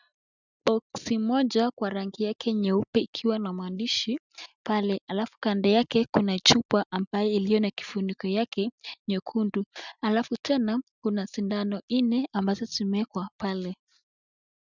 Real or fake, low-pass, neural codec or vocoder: real; 7.2 kHz; none